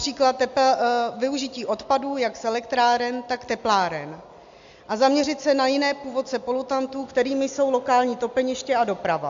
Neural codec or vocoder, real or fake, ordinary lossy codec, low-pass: none; real; AAC, 64 kbps; 7.2 kHz